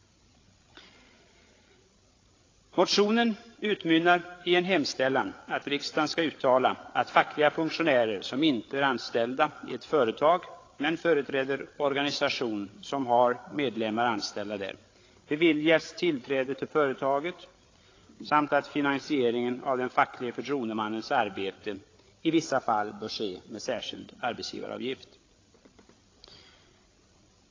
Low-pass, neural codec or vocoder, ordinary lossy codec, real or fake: 7.2 kHz; codec, 16 kHz, 8 kbps, FreqCodec, larger model; AAC, 32 kbps; fake